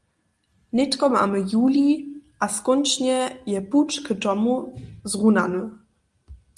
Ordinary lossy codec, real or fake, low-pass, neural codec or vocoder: Opus, 32 kbps; real; 10.8 kHz; none